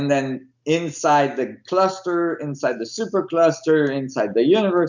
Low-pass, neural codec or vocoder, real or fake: 7.2 kHz; none; real